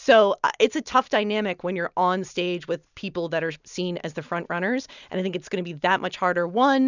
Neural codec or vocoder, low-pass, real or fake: none; 7.2 kHz; real